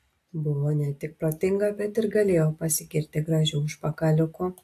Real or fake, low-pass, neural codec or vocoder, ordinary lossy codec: real; 14.4 kHz; none; AAC, 64 kbps